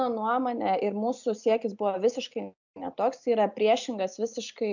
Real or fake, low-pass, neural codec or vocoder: real; 7.2 kHz; none